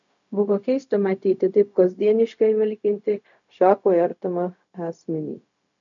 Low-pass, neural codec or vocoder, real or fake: 7.2 kHz; codec, 16 kHz, 0.4 kbps, LongCat-Audio-Codec; fake